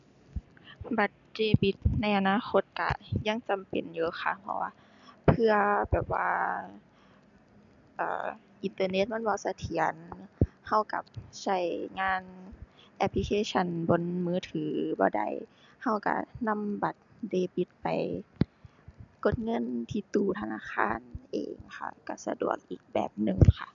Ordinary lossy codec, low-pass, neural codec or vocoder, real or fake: none; 7.2 kHz; none; real